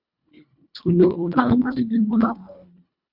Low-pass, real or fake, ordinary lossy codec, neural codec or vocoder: 5.4 kHz; fake; Opus, 64 kbps; codec, 24 kHz, 1.5 kbps, HILCodec